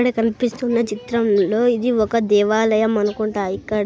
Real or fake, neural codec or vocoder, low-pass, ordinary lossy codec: real; none; none; none